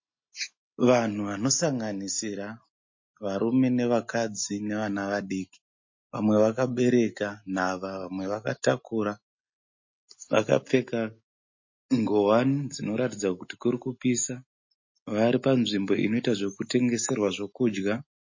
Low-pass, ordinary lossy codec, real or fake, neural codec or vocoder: 7.2 kHz; MP3, 32 kbps; real; none